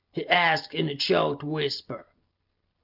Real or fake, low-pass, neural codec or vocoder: real; 5.4 kHz; none